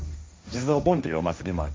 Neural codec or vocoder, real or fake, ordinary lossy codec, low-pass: codec, 16 kHz, 1.1 kbps, Voila-Tokenizer; fake; none; none